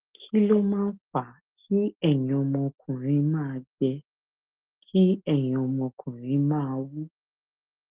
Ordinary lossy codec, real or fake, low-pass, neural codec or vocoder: Opus, 16 kbps; real; 3.6 kHz; none